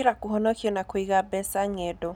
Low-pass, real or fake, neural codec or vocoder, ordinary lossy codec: none; real; none; none